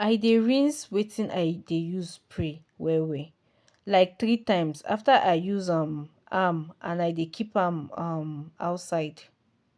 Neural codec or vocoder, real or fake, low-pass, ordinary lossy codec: none; real; none; none